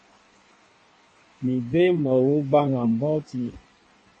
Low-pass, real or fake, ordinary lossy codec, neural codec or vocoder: 9.9 kHz; fake; MP3, 32 kbps; codec, 16 kHz in and 24 kHz out, 1.1 kbps, FireRedTTS-2 codec